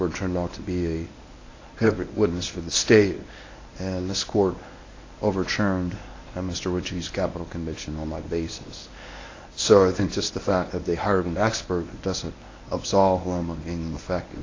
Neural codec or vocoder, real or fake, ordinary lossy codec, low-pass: codec, 24 kHz, 0.9 kbps, WavTokenizer, medium speech release version 1; fake; AAC, 32 kbps; 7.2 kHz